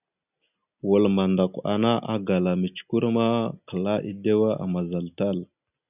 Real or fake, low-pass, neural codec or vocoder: real; 3.6 kHz; none